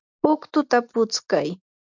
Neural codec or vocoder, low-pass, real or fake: none; 7.2 kHz; real